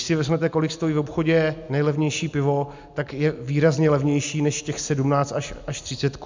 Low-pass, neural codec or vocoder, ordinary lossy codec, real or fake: 7.2 kHz; none; MP3, 64 kbps; real